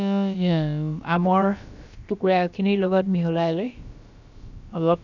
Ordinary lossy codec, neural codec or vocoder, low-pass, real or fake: none; codec, 16 kHz, about 1 kbps, DyCAST, with the encoder's durations; 7.2 kHz; fake